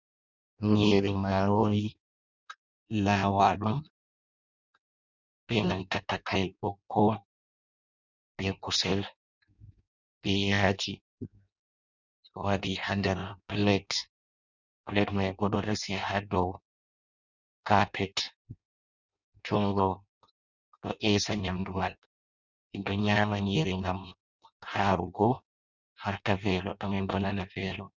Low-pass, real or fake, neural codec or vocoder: 7.2 kHz; fake; codec, 16 kHz in and 24 kHz out, 0.6 kbps, FireRedTTS-2 codec